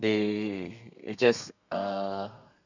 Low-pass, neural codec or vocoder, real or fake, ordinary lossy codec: 7.2 kHz; codec, 32 kHz, 1.9 kbps, SNAC; fake; none